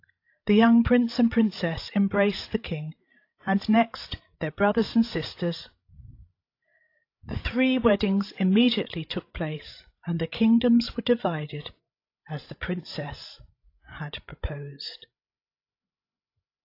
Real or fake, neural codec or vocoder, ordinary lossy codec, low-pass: fake; codec, 16 kHz, 16 kbps, FreqCodec, larger model; AAC, 32 kbps; 5.4 kHz